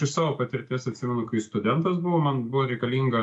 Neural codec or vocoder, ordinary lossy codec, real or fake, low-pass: none; AAC, 64 kbps; real; 10.8 kHz